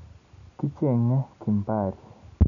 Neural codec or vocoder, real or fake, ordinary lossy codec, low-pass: none; real; none; 7.2 kHz